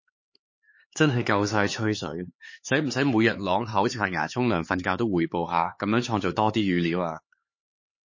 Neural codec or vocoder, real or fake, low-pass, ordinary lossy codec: codec, 16 kHz, 4 kbps, X-Codec, HuBERT features, trained on LibriSpeech; fake; 7.2 kHz; MP3, 32 kbps